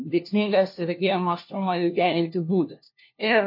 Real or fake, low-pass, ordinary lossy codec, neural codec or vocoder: fake; 5.4 kHz; MP3, 32 kbps; codec, 16 kHz, 1 kbps, FunCodec, trained on LibriTTS, 50 frames a second